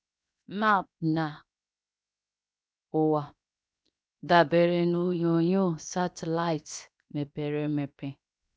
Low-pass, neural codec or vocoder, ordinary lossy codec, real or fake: none; codec, 16 kHz, 0.7 kbps, FocalCodec; none; fake